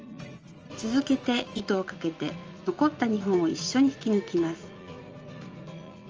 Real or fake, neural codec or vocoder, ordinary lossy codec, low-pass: fake; vocoder, 44.1 kHz, 80 mel bands, Vocos; Opus, 24 kbps; 7.2 kHz